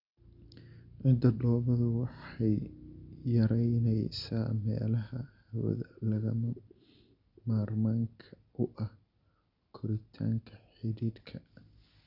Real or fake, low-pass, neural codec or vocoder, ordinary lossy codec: real; 5.4 kHz; none; Opus, 64 kbps